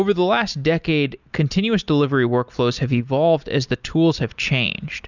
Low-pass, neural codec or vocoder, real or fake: 7.2 kHz; none; real